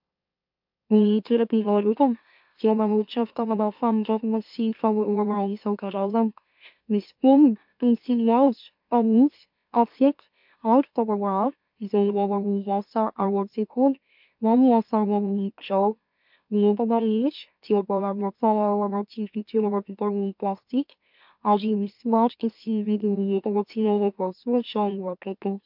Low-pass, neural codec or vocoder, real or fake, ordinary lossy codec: 5.4 kHz; autoencoder, 44.1 kHz, a latent of 192 numbers a frame, MeloTTS; fake; MP3, 48 kbps